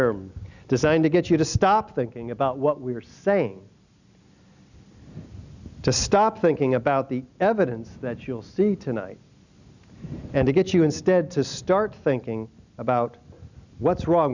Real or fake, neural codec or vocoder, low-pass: real; none; 7.2 kHz